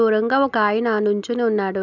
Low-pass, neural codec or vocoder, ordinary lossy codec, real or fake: 7.2 kHz; none; none; real